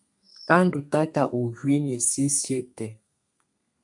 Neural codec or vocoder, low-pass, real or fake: codec, 32 kHz, 1.9 kbps, SNAC; 10.8 kHz; fake